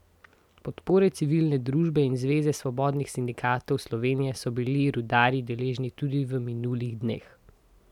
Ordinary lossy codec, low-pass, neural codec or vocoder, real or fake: none; 19.8 kHz; none; real